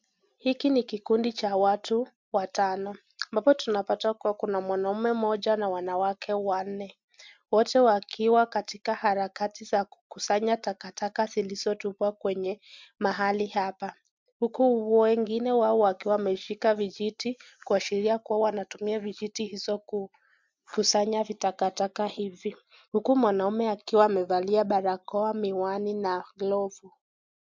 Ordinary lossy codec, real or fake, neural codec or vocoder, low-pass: MP3, 64 kbps; real; none; 7.2 kHz